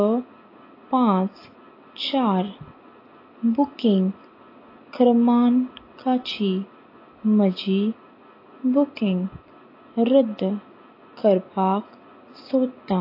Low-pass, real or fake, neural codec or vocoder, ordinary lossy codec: 5.4 kHz; real; none; AAC, 32 kbps